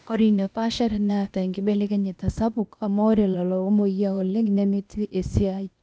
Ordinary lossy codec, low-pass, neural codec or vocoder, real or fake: none; none; codec, 16 kHz, 0.8 kbps, ZipCodec; fake